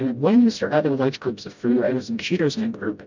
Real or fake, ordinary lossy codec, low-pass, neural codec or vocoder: fake; MP3, 64 kbps; 7.2 kHz; codec, 16 kHz, 0.5 kbps, FreqCodec, smaller model